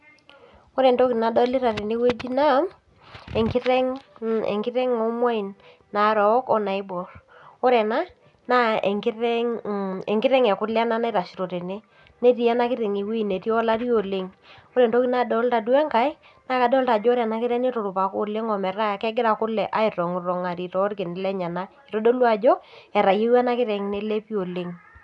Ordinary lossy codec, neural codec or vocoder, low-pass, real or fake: none; none; 10.8 kHz; real